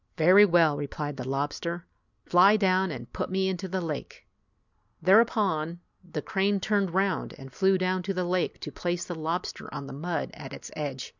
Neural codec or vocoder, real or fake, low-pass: none; real; 7.2 kHz